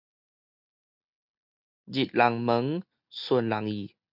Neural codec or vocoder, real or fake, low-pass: none; real; 5.4 kHz